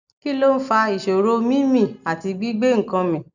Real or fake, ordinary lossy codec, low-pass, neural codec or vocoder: real; none; 7.2 kHz; none